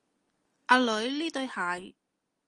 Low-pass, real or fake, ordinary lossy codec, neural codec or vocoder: 10.8 kHz; real; Opus, 24 kbps; none